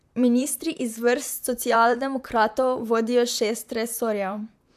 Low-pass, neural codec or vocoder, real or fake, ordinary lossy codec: 14.4 kHz; vocoder, 44.1 kHz, 128 mel bands, Pupu-Vocoder; fake; AAC, 96 kbps